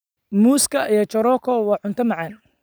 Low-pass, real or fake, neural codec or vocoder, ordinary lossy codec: none; real; none; none